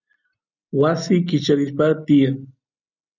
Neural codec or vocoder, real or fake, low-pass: none; real; 7.2 kHz